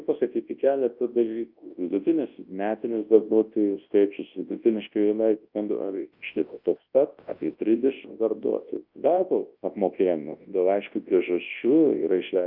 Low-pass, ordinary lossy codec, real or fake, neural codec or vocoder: 5.4 kHz; Opus, 24 kbps; fake; codec, 24 kHz, 0.9 kbps, WavTokenizer, large speech release